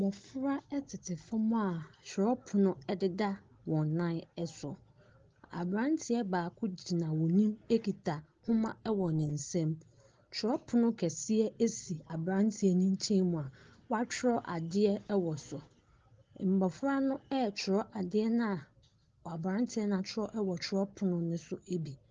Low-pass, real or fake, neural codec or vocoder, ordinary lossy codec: 7.2 kHz; real; none; Opus, 16 kbps